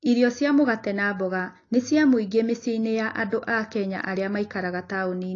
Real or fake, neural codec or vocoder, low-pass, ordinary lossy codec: real; none; 7.2 kHz; AAC, 32 kbps